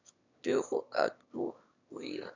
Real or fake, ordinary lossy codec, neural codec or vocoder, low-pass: fake; none; autoencoder, 22.05 kHz, a latent of 192 numbers a frame, VITS, trained on one speaker; 7.2 kHz